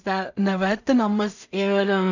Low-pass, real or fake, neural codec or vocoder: 7.2 kHz; fake; codec, 16 kHz in and 24 kHz out, 0.4 kbps, LongCat-Audio-Codec, two codebook decoder